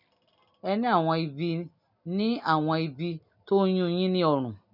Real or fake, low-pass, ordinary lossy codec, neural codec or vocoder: real; 5.4 kHz; none; none